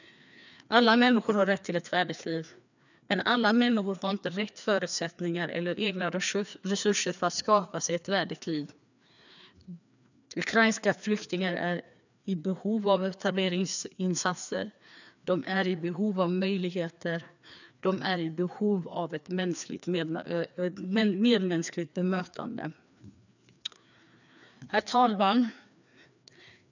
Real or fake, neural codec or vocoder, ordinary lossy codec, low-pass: fake; codec, 16 kHz, 2 kbps, FreqCodec, larger model; none; 7.2 kHz